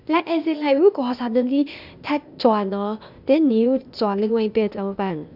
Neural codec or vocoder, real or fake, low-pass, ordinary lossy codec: codec, 16 kHz, 0.8 kbps, ZipCodec; fake; 5.4 kHz; none